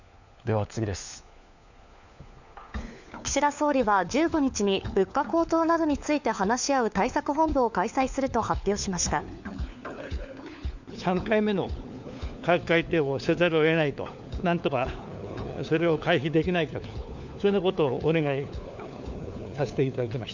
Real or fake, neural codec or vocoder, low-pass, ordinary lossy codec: fake; codec, 16 kHz, 4 kbps, FunCodec, trained on LibriTTS, 50 frames a second; 7.2 kHz; none